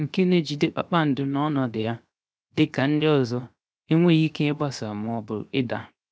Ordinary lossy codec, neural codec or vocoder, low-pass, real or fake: none; codec, 16 kHz, 0.7 kbps, FocalCodec; none; fake